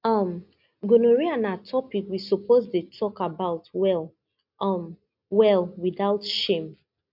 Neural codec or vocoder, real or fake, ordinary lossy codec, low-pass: none; real; MP3, 48 kbps; 5.4 kHz